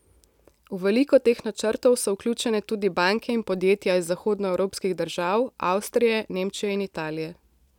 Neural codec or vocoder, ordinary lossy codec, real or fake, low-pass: none; none; real; 19.8 kHz